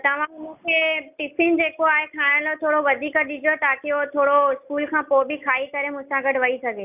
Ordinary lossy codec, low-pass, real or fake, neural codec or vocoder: none; 3.6 kHz; real; none